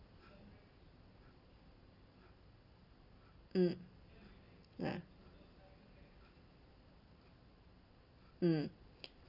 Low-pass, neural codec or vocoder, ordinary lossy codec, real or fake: 5.4 kHz; none; Opus, 64 kbps; real